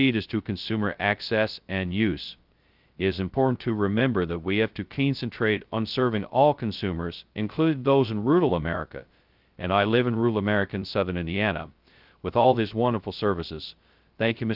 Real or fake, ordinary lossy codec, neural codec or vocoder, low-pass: fake; Opus, 32 kbps; codec, 16 kHz, 0.2 kbps, FocalCodec; 5.4 kHz